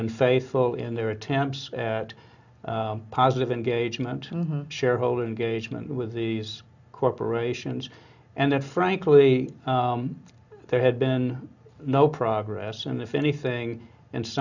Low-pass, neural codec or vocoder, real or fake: 7.2 kHz; none; real